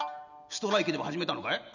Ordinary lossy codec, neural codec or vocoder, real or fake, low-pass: none; none; real; 7.2 kHz